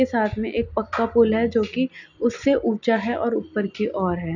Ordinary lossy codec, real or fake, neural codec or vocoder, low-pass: none; real; none; 7.2 kHz